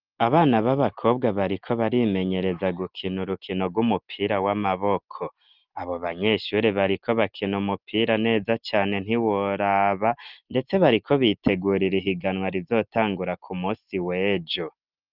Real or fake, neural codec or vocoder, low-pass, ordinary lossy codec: real; none; 5.4 kHz; Opus, 32 kbps